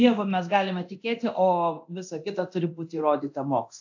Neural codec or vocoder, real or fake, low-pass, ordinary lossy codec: codec, 24 kHz, 0.9 kbps, DualCodec; fake; 7.2 kHz; AAC, 48 kbps